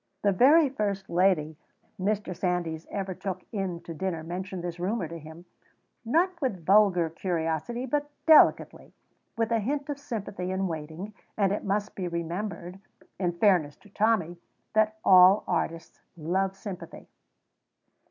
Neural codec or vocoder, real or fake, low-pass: none; real; 7.2 kHz